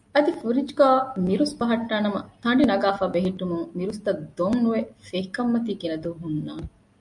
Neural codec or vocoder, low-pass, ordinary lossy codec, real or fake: none; 10.8 kHz; MP3, 64 kbps; real